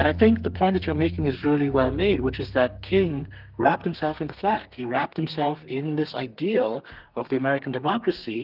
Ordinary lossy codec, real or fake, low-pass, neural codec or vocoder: Opus, 32 kbps; fake; 5.4 kHz; codec, 32 kHz, 1.9 kbps, SNAC